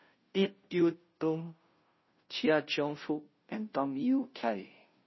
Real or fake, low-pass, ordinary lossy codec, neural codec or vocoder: fake; 7.2 kHz; MP3, 24 kbps; codec, 16 kHz, 0.5 kbps, FunCodec, trained on Chinese and English, 25 frames a second